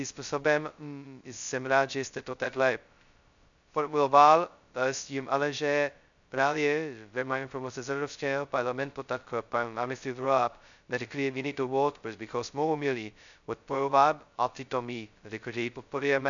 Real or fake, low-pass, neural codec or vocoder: fake; 7.2 kHz; codec, 16 kHz, 0.2 kbps, FocalCodec